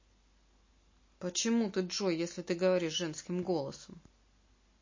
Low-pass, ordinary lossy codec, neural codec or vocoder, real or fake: 7.2 kHz; MP3, 32 kbps; none; real